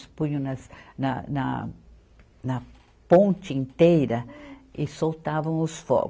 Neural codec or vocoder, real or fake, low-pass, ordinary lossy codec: none; real; none; none